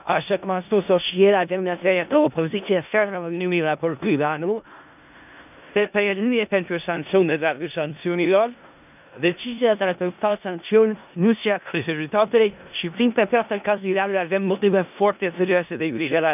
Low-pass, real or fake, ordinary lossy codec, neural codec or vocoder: 3.6 kHz; fake; none; codec, 16 kHz in and 24 kHz out, 0.4 kbps, LongCat-Audio-Codec, four codebook decoder